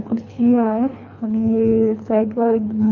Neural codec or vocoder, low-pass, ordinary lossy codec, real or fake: codec, 24 kHz, 3 kbps, HILCodec; 7.2 kHz; none; fake